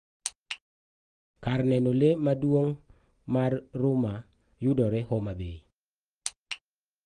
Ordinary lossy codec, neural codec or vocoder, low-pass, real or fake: Opus, 24 kbps; none; 9.9 kHz; real